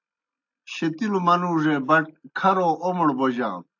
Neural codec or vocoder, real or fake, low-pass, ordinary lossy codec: none; real; 7.2 kHz; AAC, 48 kbps